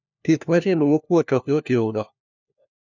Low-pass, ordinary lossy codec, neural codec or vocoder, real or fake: 7.2 kHz; none; codec, 16 kHz, 1 kbps, FunCodec, trained on LibriTTS, 50 frames a second; fake